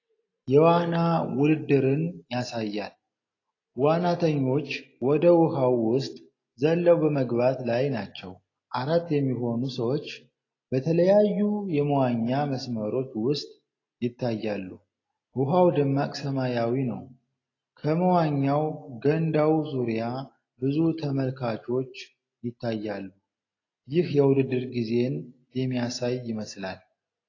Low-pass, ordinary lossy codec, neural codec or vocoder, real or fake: 7.2 kHz; AAC, 32 kbps; none; real